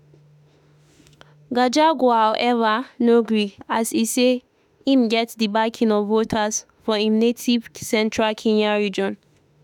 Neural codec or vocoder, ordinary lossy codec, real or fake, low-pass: autoencoder, 48 kHz, 32 numbers a frame, DAC-VAE, trained on Japanese speech; none; fake; 19.8 kHz